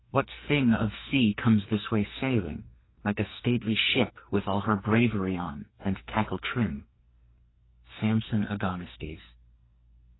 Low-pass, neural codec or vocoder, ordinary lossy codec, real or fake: 7.2 kHz; codec, 44.1 kHz, 2.6 kbps, SNAC; AAC, 16 kbps; fake